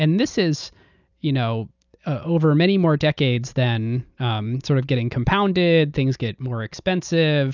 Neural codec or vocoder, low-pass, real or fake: none; 7.2 kHz; real